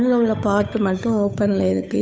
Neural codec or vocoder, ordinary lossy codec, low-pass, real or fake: codec, 16 kHz, 8 kbps, FunCodec, trained on Chinese and English, 25 frames a second; none; none; fake